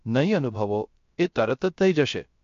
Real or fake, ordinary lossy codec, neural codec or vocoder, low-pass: fake; MP3, 48 kbps; codec, 16 kHz, 0.3 kbps, FocalCodec; 7.2 kHz